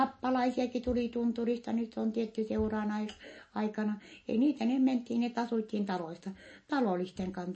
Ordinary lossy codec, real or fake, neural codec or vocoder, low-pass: MP3, 32 kbps; real; none; 9.9 kHz